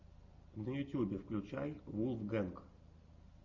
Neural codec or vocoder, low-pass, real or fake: none; 7.2 kHz; real